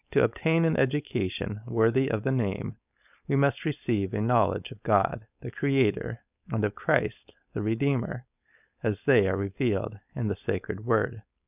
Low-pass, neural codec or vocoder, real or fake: 3.6 kHz; codec, 16 kHz, 4.8 kbps, FACodec; fake